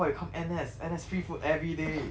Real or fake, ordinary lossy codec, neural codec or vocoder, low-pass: real; none; none; none